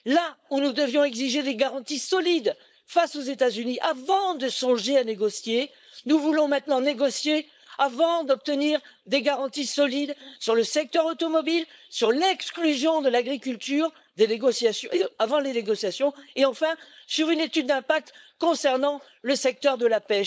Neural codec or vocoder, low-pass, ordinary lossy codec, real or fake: codec, 16 kHz, 4.8 kbps, FACodec; none; none; fake